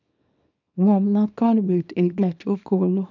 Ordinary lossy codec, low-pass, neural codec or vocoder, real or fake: none; 7.2 kHz; codec, 24 kHz, 0.9 kbps, WavTokenizer, small release; fake